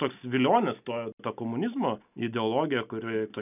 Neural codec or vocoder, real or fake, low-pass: none; real; 3.6 kHz